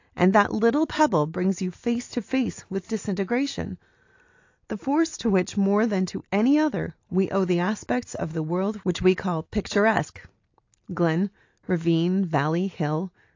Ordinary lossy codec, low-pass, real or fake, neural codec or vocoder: AAC, 48 kbps; 7.2 kHz; real; none